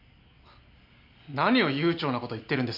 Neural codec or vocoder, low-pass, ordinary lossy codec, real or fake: none; 5.4 kHz; none; real